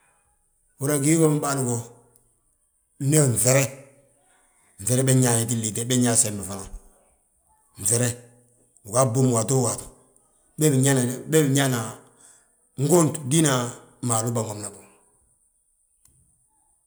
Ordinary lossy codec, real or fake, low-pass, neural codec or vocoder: none; real; none; none